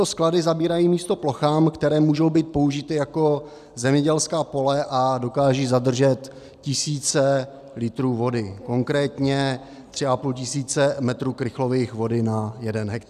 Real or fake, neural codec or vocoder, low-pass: real; none; 14.4 kHz